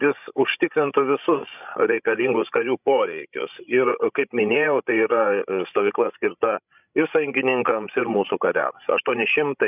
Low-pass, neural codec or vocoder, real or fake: 3.6 kHz; codec, 16 kHz, 16 kbps, FreqCodec, larger model; fake